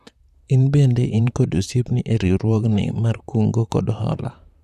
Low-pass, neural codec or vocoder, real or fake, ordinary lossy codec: 14.4 kHz; vocoder, 44.1 kHz, 128 mel bands, Pupu-Vocoder; fake; none